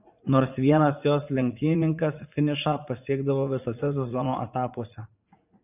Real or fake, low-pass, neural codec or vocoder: fake; 3.6 kHz; vocoder, 44.1 kHz, 80 mel bands, Vocos